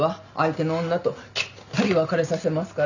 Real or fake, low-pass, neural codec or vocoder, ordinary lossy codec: real; 7.2 kHz; none; none